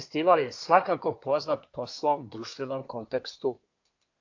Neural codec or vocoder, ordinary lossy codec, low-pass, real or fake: codec, 24 kHz, 1 kbps, SNAC; AAC, 48 kbps; 7.2 kHz; fake